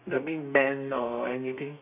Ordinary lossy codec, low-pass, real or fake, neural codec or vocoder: none; 3.6 kHz; fake; codec, 32 kHz, 1.9 kbps, SNAC